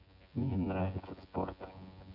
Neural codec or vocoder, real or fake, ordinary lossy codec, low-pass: vocoder, 24 kHz, 100 mel bands, Vocos; fake; none; 5.4 kHz